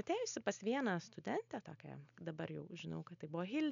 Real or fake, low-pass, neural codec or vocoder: real; 7.2 kHz; none